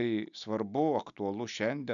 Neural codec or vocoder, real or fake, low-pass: none; real; 7.2 kHz